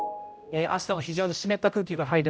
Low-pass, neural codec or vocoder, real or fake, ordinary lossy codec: none; codec, 16 kHz, 0.5 kbps, X-Codec, HuBERT features, trained on general audio; fake; none